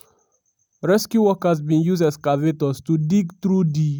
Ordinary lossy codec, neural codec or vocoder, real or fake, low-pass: none; none; real; none